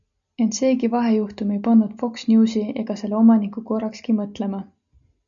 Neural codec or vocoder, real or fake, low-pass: none; real; 7.2 kHz